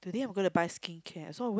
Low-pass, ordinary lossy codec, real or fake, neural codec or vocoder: none; none; real; none